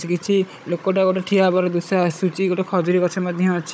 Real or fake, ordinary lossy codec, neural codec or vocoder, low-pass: fake; none; codec, 16 kHz, 16 kbps, FunCodec, trained on Chinese and English, 50 frames a second; none